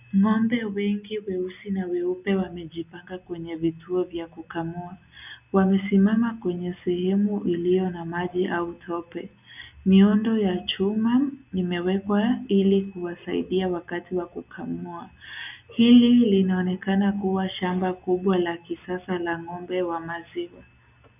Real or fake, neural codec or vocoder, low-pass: real; none; 3.6 kHz